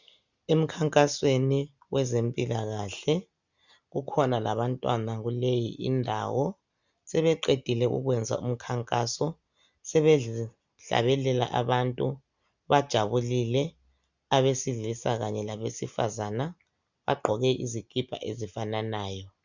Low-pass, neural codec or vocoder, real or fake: 7.2 kHz; none; real